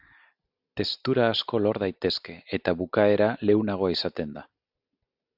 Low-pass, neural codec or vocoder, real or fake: 5.4 kHz; none; real